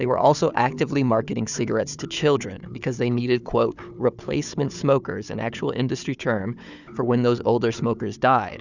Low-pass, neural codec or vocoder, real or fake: 7.2 kHz; codec, 16 kHz, 4 kbps, FunCodec, trained on Chinese and English, 50 frames a second; fake